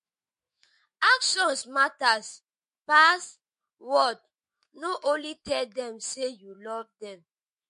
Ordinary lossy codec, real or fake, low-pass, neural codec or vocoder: MP3, 48 kbps; fake; 14.4 kHz; autoencoder, 48 kHz, 128 numbers a frame, DAC-VAE, trained on Japanese speech